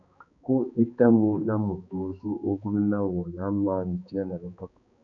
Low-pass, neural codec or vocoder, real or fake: 7.2 kHz; codec, 16 kHz, 2 kbps, X-Codec, HuBERT features, trained on balanced general audio; fake